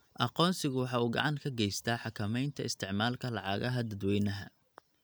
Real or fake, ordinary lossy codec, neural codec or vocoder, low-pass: real; none; none; none